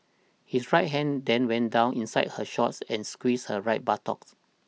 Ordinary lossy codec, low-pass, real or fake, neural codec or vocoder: none; none; real; none